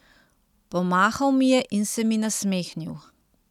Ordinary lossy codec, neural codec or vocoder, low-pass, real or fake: none; none; 19.8 kHz; real